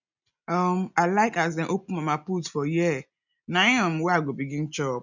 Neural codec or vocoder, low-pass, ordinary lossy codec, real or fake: none; 7.2 kHz; none; real